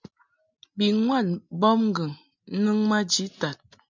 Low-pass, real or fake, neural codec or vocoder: 7.2 kHz; real; none